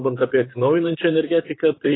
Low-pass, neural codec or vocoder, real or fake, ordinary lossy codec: 7.2 kHz; vocoder, 44.1 kHz, 128 mel bands, Pupu-Vocoder; fake; AAC, 16 kbps